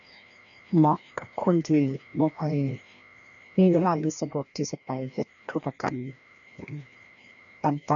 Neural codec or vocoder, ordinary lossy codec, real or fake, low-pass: codec, 16 kHz, 1 kbps, FreqCodec, larger model; none; fake; 7.2 kHz